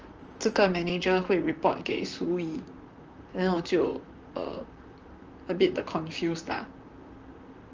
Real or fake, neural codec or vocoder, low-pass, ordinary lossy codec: fake; vocoder, 44.1 kHz, 128 mel bands, Pupu-Vocoder; 7.2 kHz; Opus, 24 kbps